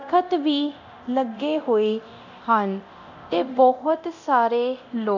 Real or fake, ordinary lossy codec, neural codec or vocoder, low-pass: fake; none; codec, 24 kHz, 0.9 kbps, DualCodec; 7.2 kHz